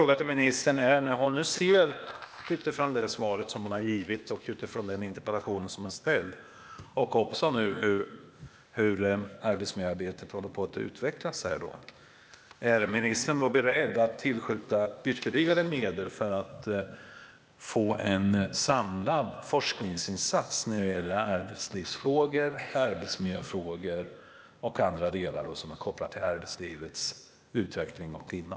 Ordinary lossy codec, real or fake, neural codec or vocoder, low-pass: none; fake; codec, 16 kHz, 0.8 kbps, ZipCodec; none